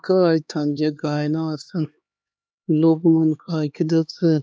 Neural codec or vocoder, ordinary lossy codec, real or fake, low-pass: codec, 16 kHz, 2 kbps, X-Codec, HuBERT features, trained on LibriSpeech; none; fake; none